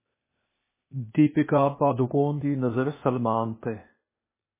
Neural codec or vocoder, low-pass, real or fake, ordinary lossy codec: codec, 16 kHz, 0.8 kbps, ZipCodec; 3.6 kHz; fake; MP3, 16 kbps